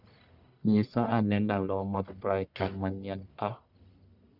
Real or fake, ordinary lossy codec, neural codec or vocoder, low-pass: fake; none; codec, 44.1 kHz, 1.7 kbps, Pupu-Codec; 5.4 kHz